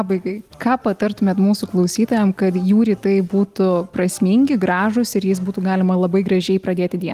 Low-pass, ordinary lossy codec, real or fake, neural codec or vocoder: 14.4 kHz; Opus, 24 kbps; real; none